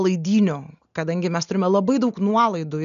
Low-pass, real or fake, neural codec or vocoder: 7.2 kHz; real; none